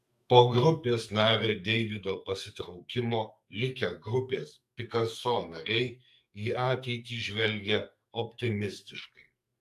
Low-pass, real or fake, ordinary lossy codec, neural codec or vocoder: 14.4 kHz; fake; AAC, 96 kbps; codec, 44.1 kHz, 2.6 kbps, SNAC